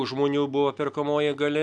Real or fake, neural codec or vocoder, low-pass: real; none; 9.9 kHz